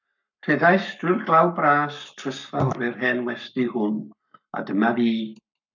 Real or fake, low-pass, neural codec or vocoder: fake; 7.2 kHz; codec, 44.1 kHz, 7.8 kbps, Pupu-Codec